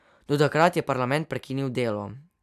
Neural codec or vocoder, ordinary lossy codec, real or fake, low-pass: none; none; real; 14.4 kHz